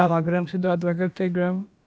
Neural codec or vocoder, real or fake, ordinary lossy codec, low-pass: codec, 16 kHz, about 1 kbps, DyCAST, with the encoder's durations; fake; none; none